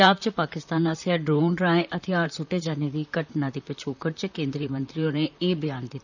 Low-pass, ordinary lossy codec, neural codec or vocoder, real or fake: 7.2 kHz; none; vocoder, 44.1 kHz, 128 mel bands, Pupu-Vocoder; fake